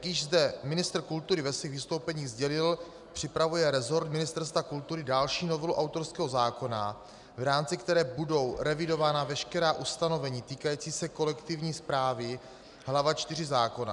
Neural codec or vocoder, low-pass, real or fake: none; 10.8 kHz; real